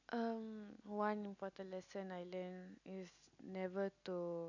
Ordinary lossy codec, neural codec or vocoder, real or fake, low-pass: none; none; real; 7.2 kHz